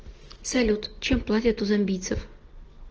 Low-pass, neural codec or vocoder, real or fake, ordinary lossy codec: 7.2 kHz; none; real; Opus, 16 kbps